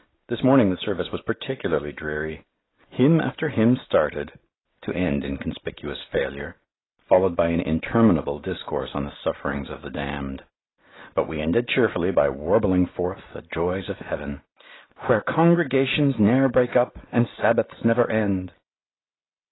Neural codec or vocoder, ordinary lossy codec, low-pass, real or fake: none; AAC, 16 kbps; 7.2 kHz; real